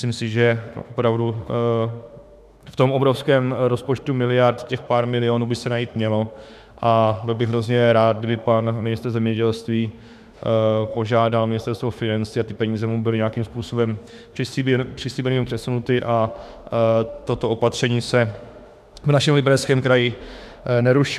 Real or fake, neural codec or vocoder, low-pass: fake; autoencoder, 48 kHz, 32 numbers a frame, DAC-VAE, trained on Japanese speech; 14.4 kHz